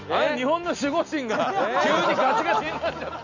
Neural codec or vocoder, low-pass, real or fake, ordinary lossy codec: none; 7.2 kHz; real; none